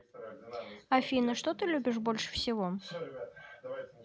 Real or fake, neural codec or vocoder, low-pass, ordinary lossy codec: real; none; none; none